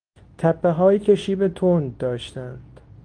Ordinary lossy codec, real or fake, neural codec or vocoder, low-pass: Opus, 24 kbps; fake; codec, 24 kHz, 0.9 kbps, WavTokenizer, medium speech release version 2; 9.9 kHz